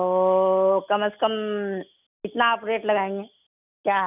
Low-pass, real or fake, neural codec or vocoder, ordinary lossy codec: 3.6 kHz; real; none; none